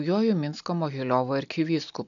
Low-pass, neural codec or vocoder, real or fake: 7.2 kHz; none; real